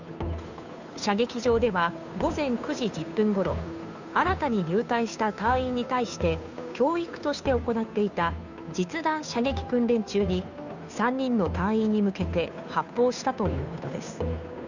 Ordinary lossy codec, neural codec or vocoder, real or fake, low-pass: none; codec, 16 kHz, 2 kbps, FunCodec, trained on Chinese and English, 25 frames a second; fake; 7.2 kHz